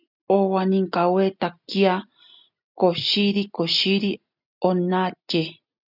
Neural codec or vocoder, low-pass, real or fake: none; 5.4 kHz; real